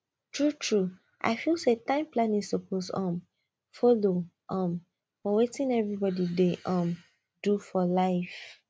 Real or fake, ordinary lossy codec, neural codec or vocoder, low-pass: real; none; none; none